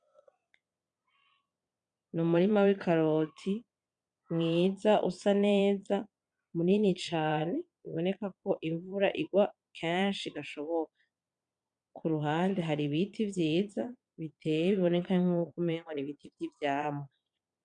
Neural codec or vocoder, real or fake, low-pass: vocoder, 22.05 kHz, 80 mel bands, Vocos; fake; 9.9 kHz